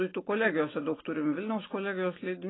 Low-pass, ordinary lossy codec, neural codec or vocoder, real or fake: 7.2 kHz; AAC, 16 kbps; none; real